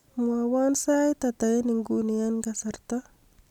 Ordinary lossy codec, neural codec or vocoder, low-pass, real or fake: none; none; 19.8 kHz; real